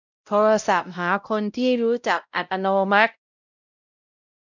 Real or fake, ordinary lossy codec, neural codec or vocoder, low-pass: fake; none; codec, 16 kHz, 0.5 kbps, X-Codec, WavLM features, trained on Multilingual LibriSpeech; 7.2 kHz